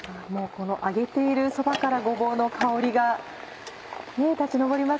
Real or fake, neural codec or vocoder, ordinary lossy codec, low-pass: real; none; none; none